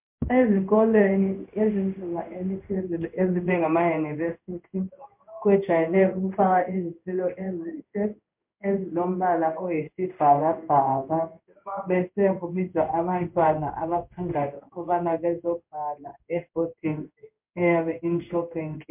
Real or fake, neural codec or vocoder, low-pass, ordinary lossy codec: fake; codec, 16 kHz in and 24 kHz out, 1 kbps, XY-Tokenizer; 3.6 kHz; MP3, 32 kbps